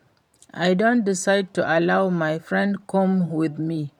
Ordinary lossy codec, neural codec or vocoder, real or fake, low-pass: none; vocoder, 44.1 kHz, 128 mel bands every 512 samples, BigVGAN v2; fake; 19.8 kHz